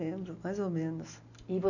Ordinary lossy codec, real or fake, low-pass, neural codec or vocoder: none; real; 7.2 kHz; none